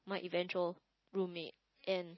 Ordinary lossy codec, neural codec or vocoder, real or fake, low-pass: MP3, 24 kbps; none; real; 7.2 kHz